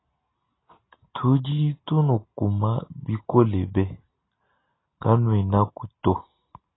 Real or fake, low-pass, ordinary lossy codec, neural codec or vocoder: real; 7.2 kHz; AAC, 16 kbps; none